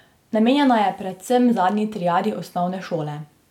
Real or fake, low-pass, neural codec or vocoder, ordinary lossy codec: real; 19.8 kHz; none; none